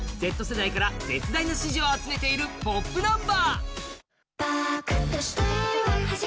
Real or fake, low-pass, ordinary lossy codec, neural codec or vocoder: real; none; none; none